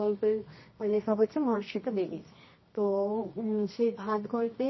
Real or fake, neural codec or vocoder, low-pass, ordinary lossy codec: fake; codec, 24 kHz, 0.9 kbps, WavTokenizer, medium music audio release; 7.2 kHz; MP3, 24 kbps